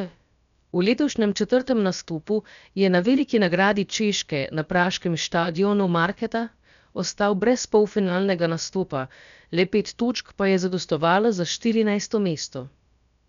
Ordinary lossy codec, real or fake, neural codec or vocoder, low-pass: none; fake; codec, 16 kHz, about 1 kbps, DyCAST, with the encoder's durations; 7.2 kHz